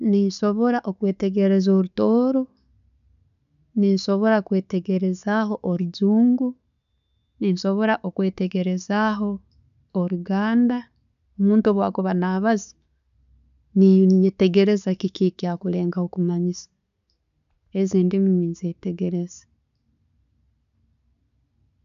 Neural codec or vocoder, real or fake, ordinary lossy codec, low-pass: none; real; none; 7.2 kHz